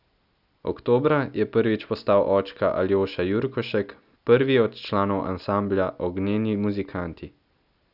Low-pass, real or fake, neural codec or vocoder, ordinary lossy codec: 5.4 kHz; real; none; none